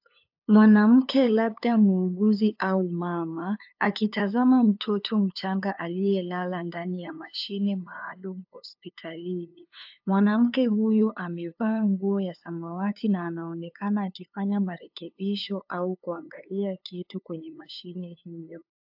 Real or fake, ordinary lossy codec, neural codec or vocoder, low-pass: fake; AAC, 48 kbps; codec, 16 kHz, 2 kbps, FunCodec, trained on LibriTTS, 25 frames a second; 5.4 kHz